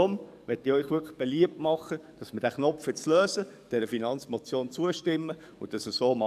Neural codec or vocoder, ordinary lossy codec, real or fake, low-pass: codec, 44.1 kHz, 7.8 kbps, DAC; none; fake; 14.4 kHz